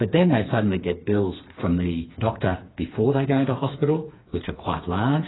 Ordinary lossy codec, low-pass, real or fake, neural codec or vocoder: AAC, 16 kbps; 7.2 kHz; fake; codec, 16 kHz, 4 kbps, FreqCodec, smaller model